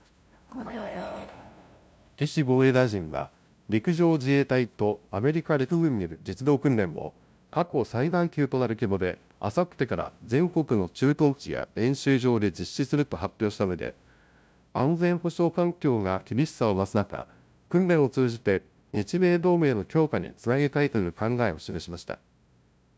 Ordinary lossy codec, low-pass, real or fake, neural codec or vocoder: none; none; fake; codec, 16 kHz, 0.5 kbps, FunCodec, trained on LibriTTS, 25 frames a second